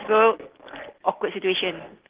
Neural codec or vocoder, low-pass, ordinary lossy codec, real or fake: none; 3.6 kHz; Opus, 16 kbps; real